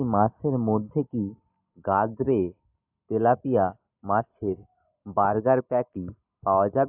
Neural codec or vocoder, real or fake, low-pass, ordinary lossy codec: none; real; 3.6 kHz; AAC, 32 kbps